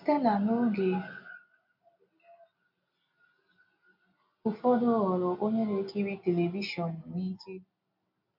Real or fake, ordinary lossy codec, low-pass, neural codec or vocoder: real; MP3, 48 kbps; 5.4 kHz; none